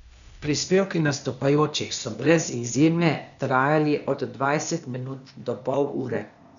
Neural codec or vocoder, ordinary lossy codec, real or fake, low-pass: codec, 16 kHz, 0.8 kbps, ZipCodec; none; fake; 7.2 kHz